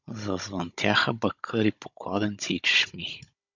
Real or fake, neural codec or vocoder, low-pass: fake; codec, 16 kHz, 16 kbps, FunCodec, trained on Chinese and English, 50 frames a second; 7.2 kHz